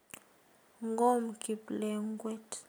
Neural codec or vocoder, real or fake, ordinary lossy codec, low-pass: none; real; none; none